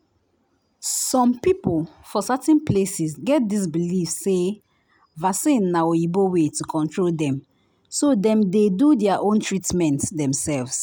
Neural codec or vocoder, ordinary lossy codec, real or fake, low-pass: none; none; real; none